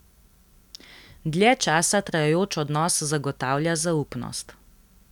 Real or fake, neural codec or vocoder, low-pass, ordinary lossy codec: real; none; 19.8 kHz; none